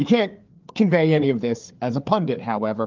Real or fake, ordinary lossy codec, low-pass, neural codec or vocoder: fake; Opus, 32 kbps; 7.2 kHz; codec, 16 kHz, 4 kbps, FreqCodec, larger model